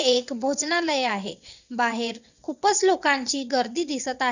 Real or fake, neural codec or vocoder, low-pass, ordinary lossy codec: fake; vocoder, 22.05 kHz, 80 mel bands, WaveNeXt; 7.2 kHz; none